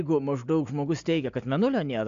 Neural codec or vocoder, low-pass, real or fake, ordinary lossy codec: none; 7.2 kHz; real; AAC, 64 kbps